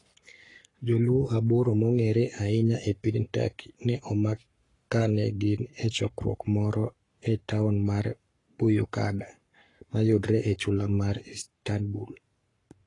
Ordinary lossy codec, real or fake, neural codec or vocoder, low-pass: AAC, 32 kbps; fake; codec, 44.1 kHz, 7.8 kbps, Pupu-Codec; 10.8 kHz